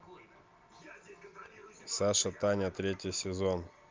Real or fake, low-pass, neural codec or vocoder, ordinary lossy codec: real; 7.2 kHz; none; Opus, 24 kbps